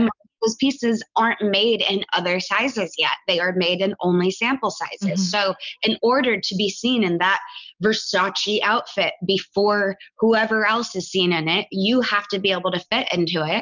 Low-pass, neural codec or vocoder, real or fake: 7.2 kHz; none; real